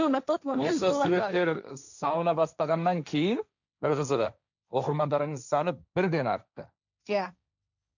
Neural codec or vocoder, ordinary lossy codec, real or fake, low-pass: codec, 16 kHz, 1.1 kbps, Voila-Tokenizer; none; fake; 7.2 kHz